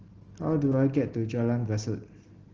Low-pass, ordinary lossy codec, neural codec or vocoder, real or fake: 7.2 kHz; Opus, 16 kbps; none; real